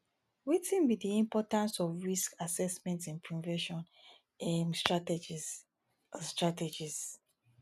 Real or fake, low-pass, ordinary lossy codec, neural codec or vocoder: real; 14.4 kHz; none; none